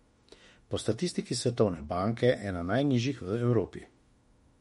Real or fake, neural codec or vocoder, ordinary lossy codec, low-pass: fake; autoencoder, 48 kHz, 32 numbers a frame, DAC-VAE, trained on Japanese speech; MP3, 48 kbps; 19.8 kHz